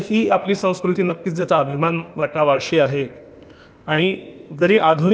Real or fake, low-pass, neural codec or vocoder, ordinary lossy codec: fake; none; codec, 16 kHz, 0.8 kbps, ZipCodec; none